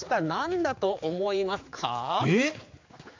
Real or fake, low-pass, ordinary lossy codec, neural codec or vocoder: fake; 7.2 kHz; MP3, 48 kbps; codec, 16 kHz, 4 kbps, X-Codec, HuBERT features, trained on general audio